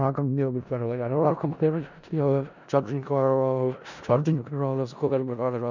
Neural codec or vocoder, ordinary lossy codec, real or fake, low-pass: codec, 16 kHz in and 24 kHz out, 0.4 kbps, LongCat-Audio-Codec, four codebook decoder; none; fake; 7.2 kHz